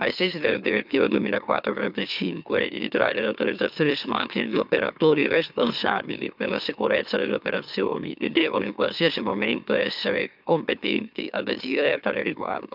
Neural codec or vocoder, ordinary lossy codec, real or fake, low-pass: autoencoder, 44.1 kHz, a latent of 192 numbers a frame, MeloTTS; none; fake; 5.4 kHz